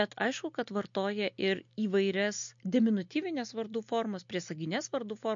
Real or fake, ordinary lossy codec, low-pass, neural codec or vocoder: real; MP3, 48 kbps; 7.2 kHz; none